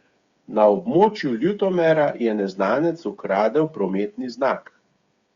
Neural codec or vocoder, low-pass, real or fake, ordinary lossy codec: codec, 16 kHz, 8 kbps, FreqCodec, smaller model; 7.2 kHz; fake; Opus, 64 kbps